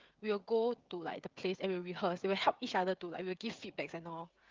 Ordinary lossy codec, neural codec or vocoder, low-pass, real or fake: Opus, 24 kbps; codec, 16 kHz, 16 kbps, FreqCodec, smaller model; 7.2 kHz; fake